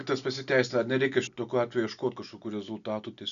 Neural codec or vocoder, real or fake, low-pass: none; real; 7.2 kHz